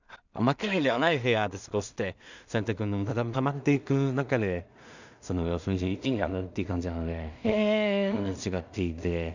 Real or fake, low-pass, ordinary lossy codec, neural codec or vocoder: fake; 7.2 kHz; none; codec, 16 kHz in and 24 kHz out, 0.4 kbps, LongCat-Audio-Codec, two codebook decoder